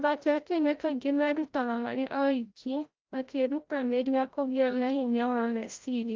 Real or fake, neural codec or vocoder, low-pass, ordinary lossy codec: fake; codec, 16 kHz, 0.5 kbps, FreqCodec, larger model; 7.2 kHz; Opus, 32 kbps